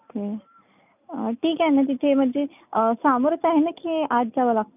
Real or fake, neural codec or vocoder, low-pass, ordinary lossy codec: real; none; 3.6 kHz; none